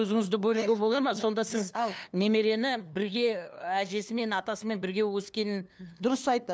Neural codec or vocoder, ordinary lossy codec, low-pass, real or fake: codec, 16 kHz, 2 kbps, FunCodec, trained on LibriTTS, 25 frames a second; none; none; fake